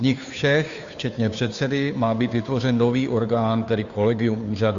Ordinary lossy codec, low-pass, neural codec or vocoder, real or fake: Opus, 64 kbps; 7.2 kHz; codec, 16 kHz, 2 kbps, FunCodec, trained on Chinese and English, 25 frames a second; fake